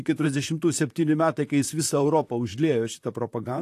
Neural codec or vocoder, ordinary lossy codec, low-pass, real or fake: vocoder, 44.1 kHz, 128 mel bands, Pupu-Vocoder; AAC, 64 kbps; 14.4 kHz; fake